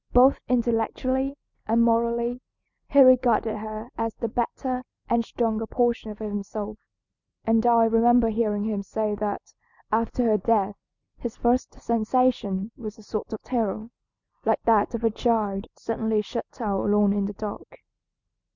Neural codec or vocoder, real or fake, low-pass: none; real; 7.2 kHz